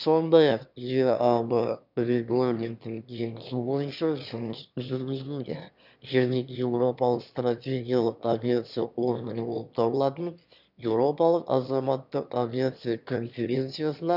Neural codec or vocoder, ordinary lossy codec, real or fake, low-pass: autoencoder, 22.05 kHz, a latent of 192 numbers a frame, VITS, trained on one speaker; none; fake; 5.4 kHz